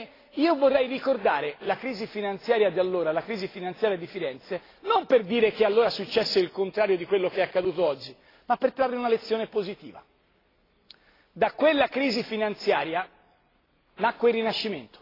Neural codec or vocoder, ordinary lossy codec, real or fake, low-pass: none; AAC, 24 kbps; real; 5.4 kHz